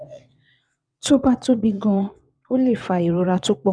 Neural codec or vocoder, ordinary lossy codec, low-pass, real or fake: vocoder, 22.05 kHz, 80 mel bands, WaveNeXt; none; 9.9 kHz; fake